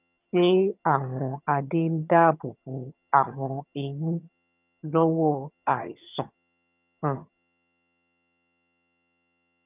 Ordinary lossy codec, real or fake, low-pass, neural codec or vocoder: none; fake; 3.6 kHz; vocoder, 22.05 kHz, 80 mel bands, HiFi-GAN